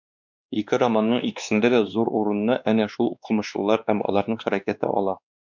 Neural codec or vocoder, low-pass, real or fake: codec, 16 kHz, 2 kbps, X-Codec, WavLM features, trained on Multilingual LibriSpeech; 7.2 kHz; fake